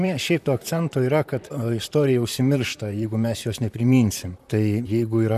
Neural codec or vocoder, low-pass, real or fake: vocoder, 44.1 kHz, 128 mel bands, Pupu-Vocoder; 14.4 kHz; fake